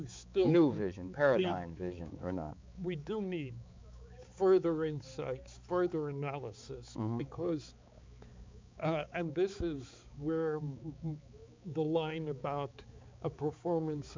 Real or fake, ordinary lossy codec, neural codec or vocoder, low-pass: fake; MP3, 64 kbps; codec, 16 kHz, 4 kbps, X-Codec, HuBERT features, trained on balanced general audio; 7.2 kHz